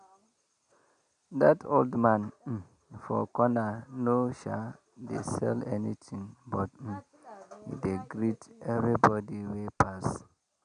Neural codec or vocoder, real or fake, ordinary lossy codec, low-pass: none; real; none; 9.9 kHz